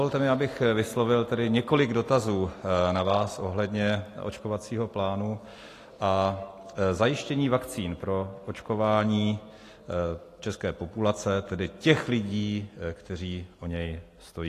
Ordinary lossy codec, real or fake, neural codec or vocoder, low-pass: AAC, 48 kbps; real; none; 14.4 kHz